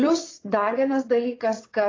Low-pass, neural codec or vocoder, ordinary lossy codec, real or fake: 7.2 kHz; vocoder, 22.05 kHz, 80 mel bands, WaveNeXt; AAC, 32 kbps; fake